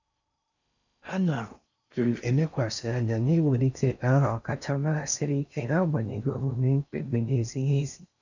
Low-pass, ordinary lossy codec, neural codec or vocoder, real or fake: 7.2 kHz; none; codec, 16 kHz in and 24 kHz out, 0.6 kbps, FocalCodec, streaming, 4096 codes; fake